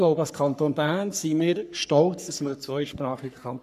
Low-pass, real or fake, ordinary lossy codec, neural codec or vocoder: 14.4 kHz; fake; none; codec, 44.1 kHz, 3.4 kbps, Pupu-Codec